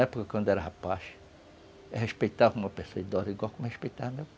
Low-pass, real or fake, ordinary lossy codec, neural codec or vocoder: none; real; none; none